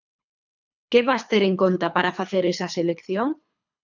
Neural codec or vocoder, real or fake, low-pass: codec, 24 kHz, 3 kbps, HILCodec; fake; 7.2 kHz